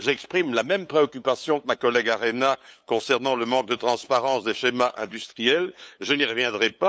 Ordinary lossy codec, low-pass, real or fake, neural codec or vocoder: none; none; fake; codec, 16 kHz, 8 kbps, FunCodec, trained on LibriTTS, 25 frames a second